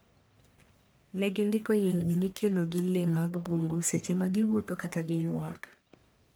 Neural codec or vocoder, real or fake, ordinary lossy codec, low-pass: codec, 44.1 kHz, 1.7 kbps, Pupu-Codec; fake; none; none